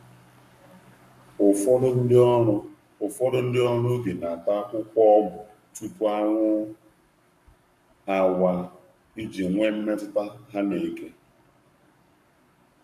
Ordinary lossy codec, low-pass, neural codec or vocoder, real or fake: none; 14.4 kHz; codec, 44.1 kHz, 7.8 kbps, Pupu-Codec; fake